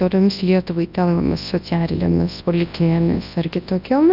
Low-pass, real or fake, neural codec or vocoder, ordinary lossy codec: 5.4 kHz; fake; codec, 24 kHz, 0.9 kbps, WavTokenizer, large speech release; AAC, 48 kbps